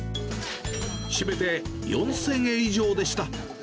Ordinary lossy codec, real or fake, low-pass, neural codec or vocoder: none; real; none; none